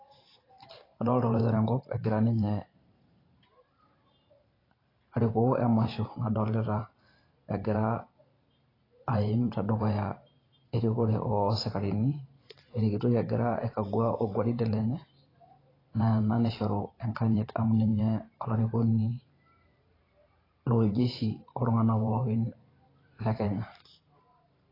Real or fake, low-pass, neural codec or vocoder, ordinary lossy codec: fake; 5.4 kHz; vocoder, 44.1 kHz, 128 mel bands every 512 samples, BigVGAN v2; AAC, 24 kbps